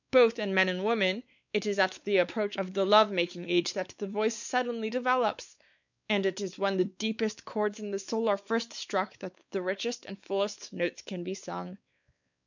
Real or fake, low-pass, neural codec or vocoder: fake; 7.2 kHz; codec, 16 kHz, 4 kbps, X-Codec, WavLM features, trained on Multilingual LibriSpeech